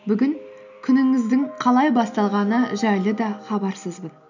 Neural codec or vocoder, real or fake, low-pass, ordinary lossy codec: none; real; 7.2 kHz; none